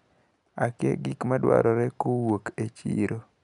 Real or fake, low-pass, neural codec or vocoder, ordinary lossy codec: real; 10.8 kHz; none; none